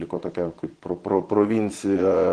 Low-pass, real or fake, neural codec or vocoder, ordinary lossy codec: 9.9 kHz; fake; vocoder, 22.05 kHz, 80 mel bands, Vocos; Opus, 16 kbps